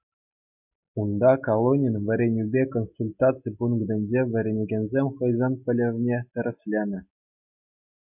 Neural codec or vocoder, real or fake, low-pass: none; real; 3.6 kHz